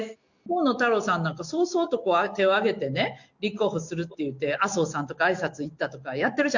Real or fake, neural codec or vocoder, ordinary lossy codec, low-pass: real; none; none; 7.2 kHz